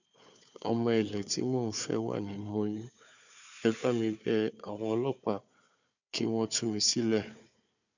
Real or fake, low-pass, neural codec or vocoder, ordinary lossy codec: fake; 7.2 kHz; codec, 16 kHz, 4 kbps, FunCodec, trained on Chinese and English, 50 frames a second; none